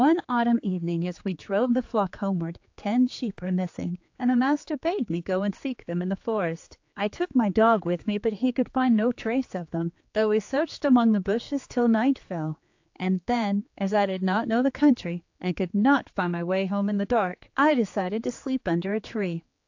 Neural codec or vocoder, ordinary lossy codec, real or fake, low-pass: codec, 16 kHz, 4 kbps, X-Codec, HuBERT features, trained on general audio; AAC, 48 kbps; fake; 7.2 kHz